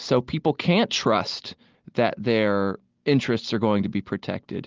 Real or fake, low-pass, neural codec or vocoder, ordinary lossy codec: real; 7.2 kHz; none; Opus, 32 kbps